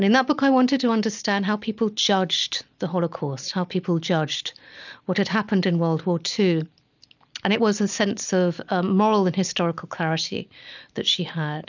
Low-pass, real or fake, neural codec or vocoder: 7.2 kHz; real; none